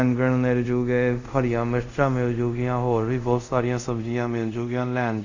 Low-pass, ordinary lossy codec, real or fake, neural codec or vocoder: 7.2 kHz; Opus, 64 kbps; fake; codec, 24 kHz, 0.5 kbps, DualCodec